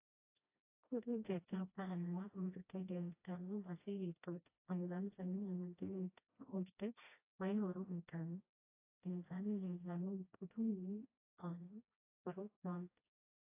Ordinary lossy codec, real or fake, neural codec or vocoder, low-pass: AAC, 24 kbps; fake; codec, 16 kHz, 1 kbps, FreqCodec, smaller model; 3.6 kHz